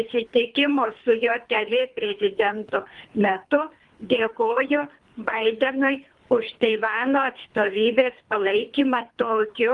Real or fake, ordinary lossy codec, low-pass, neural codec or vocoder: fake; Opus, 32 kbps; 10.8 kHz; codec, 24 kHz, 3 kbps, HILCodec